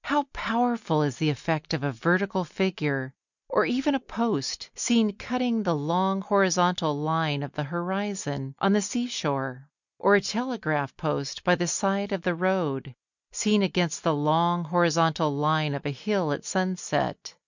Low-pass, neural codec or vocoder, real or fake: 7.2 kHz; none; real